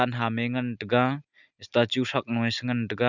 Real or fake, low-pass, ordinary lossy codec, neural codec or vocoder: real; 7.2 kHz; none; none